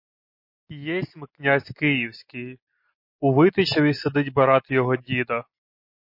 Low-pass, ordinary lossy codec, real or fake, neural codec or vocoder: 5.4 kHz; MP3, 32 kbps; real; none